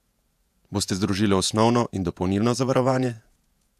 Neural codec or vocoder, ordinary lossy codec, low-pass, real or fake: vocoder, 48 kHz, 128 mel bands, Vocos; AAC, 96 kbps; 14.4 kHz; fake